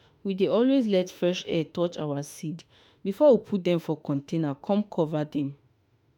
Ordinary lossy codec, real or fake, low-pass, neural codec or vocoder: none; fake; 19.8 kHz; autoencoder, 48 kHz, 32 numbers a frame, DAC-VAE, trained on Japanese speech